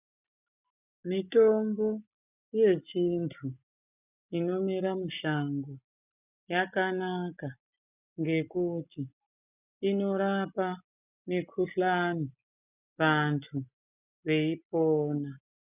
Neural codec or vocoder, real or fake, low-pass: none; real; 3.6 kHz